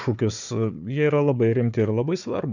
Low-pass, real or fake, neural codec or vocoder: 7.2 kHz; fake; codec, 16 kHz, 4 kbps, FunCodec, trained on Chinese and English, 50 frames a second